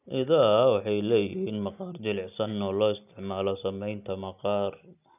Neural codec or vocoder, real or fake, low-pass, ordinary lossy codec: none; real; 3.6 kHz; none